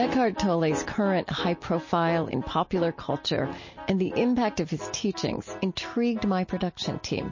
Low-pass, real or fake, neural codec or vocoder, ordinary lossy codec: 7.2 kHz; real; none; MP3, 32 kbps